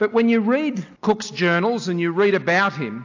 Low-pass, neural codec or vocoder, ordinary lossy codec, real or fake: 7.2 kHz; none; AAC, 48 kbps; real